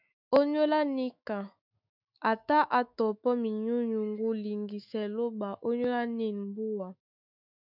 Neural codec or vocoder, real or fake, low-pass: autoencoder, 48 kHz, 128 numbers a frame, DAC-VAE, trained on Japanese speech; fake; 5.4 kHz